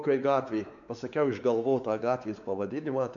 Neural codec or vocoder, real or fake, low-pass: codec, 16 kHz, 4 kbps, X-Codec, WavLM features, trained on Multilingual LibriSpeech; fake; 7.2 kHz